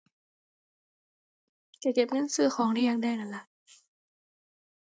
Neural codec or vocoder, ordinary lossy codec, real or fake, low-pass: none; none; real; none